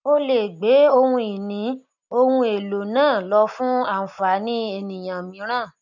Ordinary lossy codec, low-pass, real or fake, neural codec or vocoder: none; 7.2 kHz; real; none